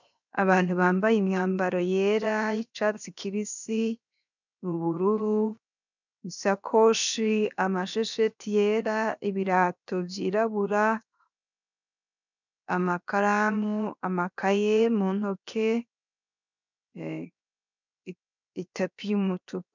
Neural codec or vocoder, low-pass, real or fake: codec, 16 kHz, 0.7 kbps, FocalCodec; 7.2 kHz; fake